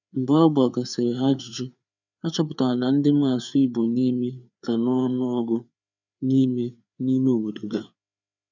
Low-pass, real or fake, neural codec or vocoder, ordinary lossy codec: 7.2 kHz; fake; codec, 16 kHz, 4 kbps, FreqCodec, larger model; none